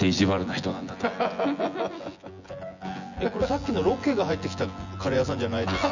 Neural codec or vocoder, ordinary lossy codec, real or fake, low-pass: vocoder, 24 kHz, 100 mel bands, Vocos; none; fake; 7.2 kHz